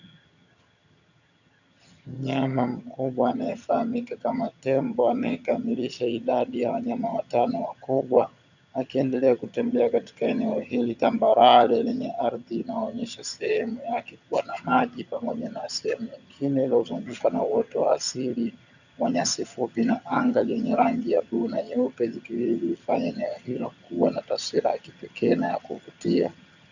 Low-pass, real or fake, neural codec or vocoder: 7.2 kHz; fake; vocoder, 22.05 kHz, 80 mel bands, HiFi-GAN